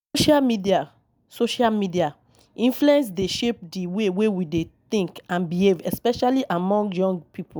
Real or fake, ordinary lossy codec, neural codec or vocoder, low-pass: real; none; none; none